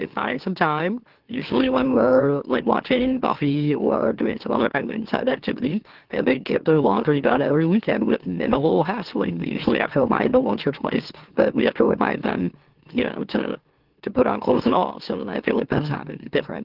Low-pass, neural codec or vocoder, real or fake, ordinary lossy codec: 5.4 kHz; autoencoder, 44.1 kHz, a latent of 192 numbers a frame, MeloTTS; fake; Opus, 16 kbps